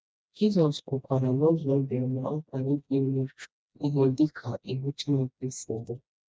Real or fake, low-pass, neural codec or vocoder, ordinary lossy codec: fake; none; codec, 16 kHz, 1 kbps, FreqCodec, smaller model; none